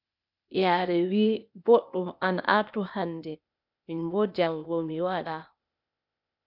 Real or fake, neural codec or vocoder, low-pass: fake; codec, 16 kHz, 0.8 kbps, ZipCodec; 5.4 kHz